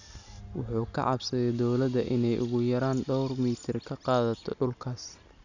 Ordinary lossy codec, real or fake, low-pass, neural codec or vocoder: none; real; 7.2 kHz; none